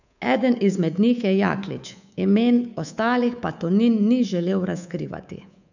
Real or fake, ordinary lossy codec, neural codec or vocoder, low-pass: fake; none; codec, 24 kHz, 3.1 kbps, DualCodec; 7.2 kHz